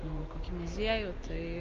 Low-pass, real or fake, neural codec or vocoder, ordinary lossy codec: 7.2 kHz; real; none; Opus, 32 kbps